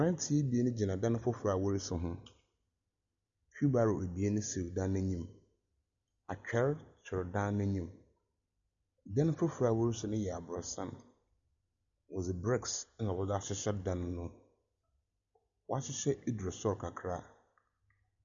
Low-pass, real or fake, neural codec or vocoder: 7.2 kHz; real; none